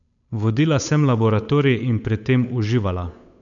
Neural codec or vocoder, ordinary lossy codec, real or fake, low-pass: codec, 16 kHz, 8 kbps, FunCodec, trained on Chinese and English, 25 frames a second; none; fake; 7.2 kHz